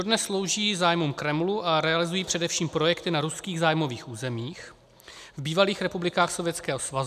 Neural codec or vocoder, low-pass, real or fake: none; 14.4 kHz; real